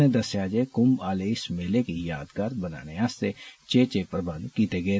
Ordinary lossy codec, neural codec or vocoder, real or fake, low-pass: none; none; real; none